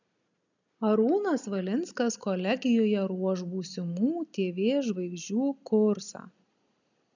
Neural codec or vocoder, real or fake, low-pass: none; real; 7.2 kHz